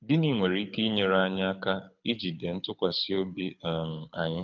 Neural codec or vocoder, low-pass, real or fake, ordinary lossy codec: codec, 24 kHz, 6 kbps, HILCodec; 7.2 kHz; fake; none